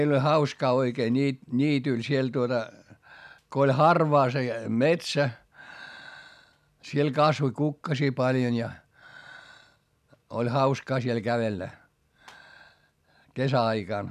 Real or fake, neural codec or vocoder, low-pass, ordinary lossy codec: real; none; 14.4 kHz; none